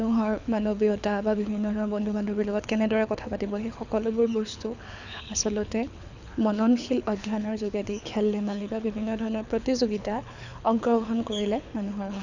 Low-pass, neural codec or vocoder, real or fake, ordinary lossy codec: 7.2 kHz; codec, 24 kHz, 6 kbps, HILCodec; fake; none